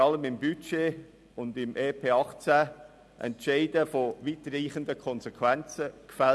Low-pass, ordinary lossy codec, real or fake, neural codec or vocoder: none; none; real; none